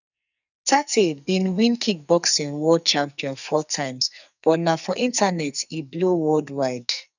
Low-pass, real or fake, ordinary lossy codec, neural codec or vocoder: 7.2 kHz; fake; none; codec, 44.1 kHz, 2.6 kbps, SNAC